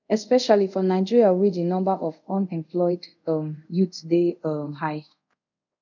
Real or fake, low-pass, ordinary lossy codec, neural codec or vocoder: fake; 7.2 kHz; none; codec, 24 kHz, 0.5 kbps, DualCodec